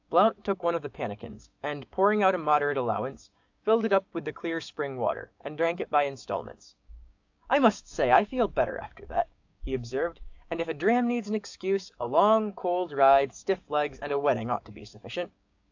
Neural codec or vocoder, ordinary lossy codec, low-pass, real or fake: codec, 16 kHz, 6 kbps, DAC; AAC, 48 kbps; 7.2 kHz; fake